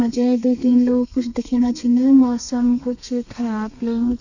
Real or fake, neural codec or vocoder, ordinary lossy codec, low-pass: fake; codec, 32 kHz, 1.9 kbps, SNAC; none; 7.2 kHz